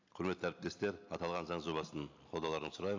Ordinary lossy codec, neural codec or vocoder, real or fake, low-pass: AAC, 48 kbps; none; real; 7.2 kHz